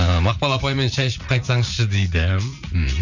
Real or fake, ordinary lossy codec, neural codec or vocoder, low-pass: fake; none; vocoder, 44.1 kHz, 80 mel bands, Vocos; 7.2 kHz